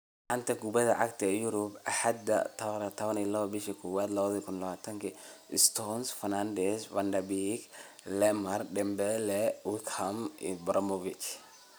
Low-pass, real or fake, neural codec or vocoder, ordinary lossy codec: none; real; none; none